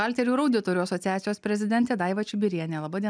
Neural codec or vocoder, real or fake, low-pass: none; real; 9.9 kHz